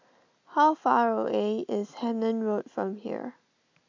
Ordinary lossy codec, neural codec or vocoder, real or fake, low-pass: MP3, 64 kbps; none; real; 7.2 kHz